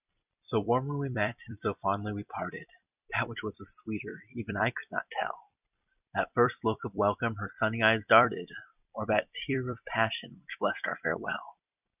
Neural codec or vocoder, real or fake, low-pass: vocoder, 44.1 kHz, 128 mel bands every 256 samples, BigVGAN v2; fake; 3.6 kHz